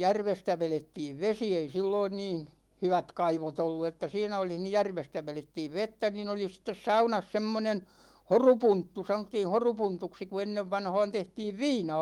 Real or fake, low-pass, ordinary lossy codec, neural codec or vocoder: fake; 19.8 kHz; Opus, 24 kbps; autoencoder, 48 kHz, 128 numbers a frame, DAC-VAE, trained on Japanese speech